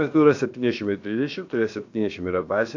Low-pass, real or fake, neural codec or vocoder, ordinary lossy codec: 7.2 kHz; fake; codec, 16 kHz, about 1 kbps, DyCAST, with the encoder's durations; AAC, 48 kbps